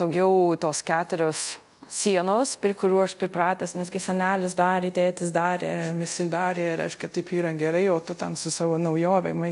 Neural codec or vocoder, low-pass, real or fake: codec, 24 kHz, 0.5 kbps, DualCodec; 10.8 kHz; fake